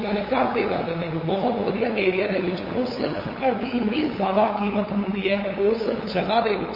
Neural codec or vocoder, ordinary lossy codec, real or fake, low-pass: codec, 16 kHz, 8 kbps, FunCodec, trained on LibriTTS, 25 frames a second; MP3, 24 kbps; fake; 5.4 kHz